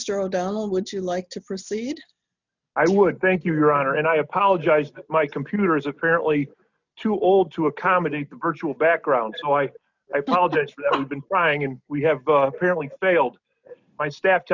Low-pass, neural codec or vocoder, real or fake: 7.2 kHz; none; real